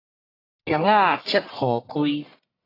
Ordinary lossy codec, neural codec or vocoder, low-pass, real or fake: AAC, 24 kbps; codec, 44.1 kHz, 1.7 kbps, Pupu-Codec; 5.4 kHz; fake